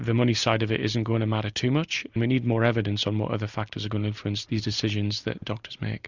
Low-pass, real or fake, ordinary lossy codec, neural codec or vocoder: 7.2 kHz; real; Opus, 64 kbps; none